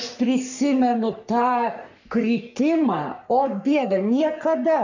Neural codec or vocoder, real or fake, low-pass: codec, 44.1 kHz, 3.4 kbps, Pupu-Codec; fake; 7.2 kHz